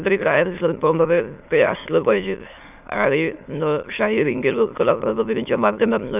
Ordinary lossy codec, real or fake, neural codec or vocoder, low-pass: none; fake; autoencoder, 22.05 kHz, a latent of 192 numbers a frame, VITS, trained on many speakers; 3.6 kHz